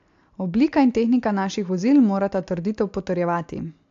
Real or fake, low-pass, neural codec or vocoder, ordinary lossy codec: real; 7.2 kHz; none; AAC, 64 kbps